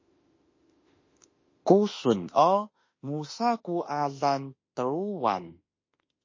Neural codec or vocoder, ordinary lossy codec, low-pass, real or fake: autoencoder, 48 kHz, 32 numbers a frame, DAC-VAE, trained on Japanese speech; MP3, 32 kbps; 7.2 kHz; fake